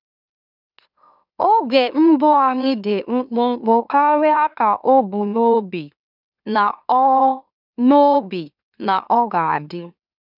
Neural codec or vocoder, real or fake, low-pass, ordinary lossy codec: autoencoder, 44.1 kHz, a latent of 192 numbers a frame, MeloTTS; fake; 5.4 kHz; none